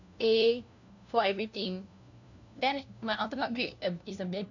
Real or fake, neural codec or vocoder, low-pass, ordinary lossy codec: fake; codec, 16 kHz, 1 kbps, FunCodec, trained on LibriTTS, 50 frames a second; 7.2 kHz; none